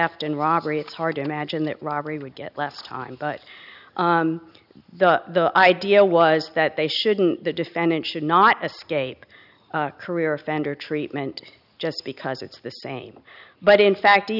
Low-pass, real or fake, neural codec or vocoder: 5.4 kHz; real; none